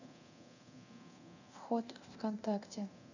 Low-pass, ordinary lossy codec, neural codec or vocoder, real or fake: 7.2 kHz; none; codec, 24 kHz, 0.9 kbps, DualCodec; fake